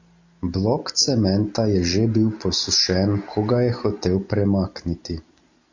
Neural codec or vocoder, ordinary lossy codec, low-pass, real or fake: none; AAC, 48 kbps; 7.2 kHz; real